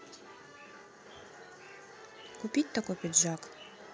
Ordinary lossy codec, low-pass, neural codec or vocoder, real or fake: none; none; none; real